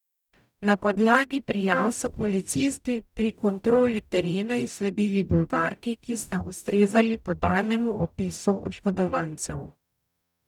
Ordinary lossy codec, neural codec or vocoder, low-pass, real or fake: none; codec, 44.1 kHz, 0.9 kbps, DAC; 19.8 kHz; fake